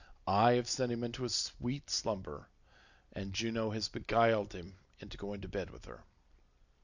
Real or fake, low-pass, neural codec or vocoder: real; 7.2 kHz; none